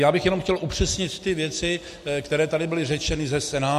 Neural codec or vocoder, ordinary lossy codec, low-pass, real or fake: none; MP3, 64 kbps; 14.4 kHz; real